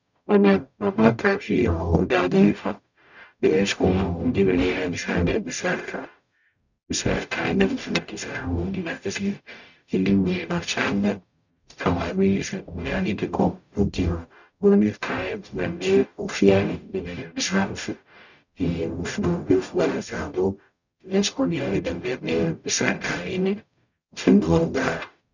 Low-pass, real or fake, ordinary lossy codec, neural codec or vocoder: 7.2 kHz; fake; none; codec, 44.1 kHz, 0.9 kbps, DAC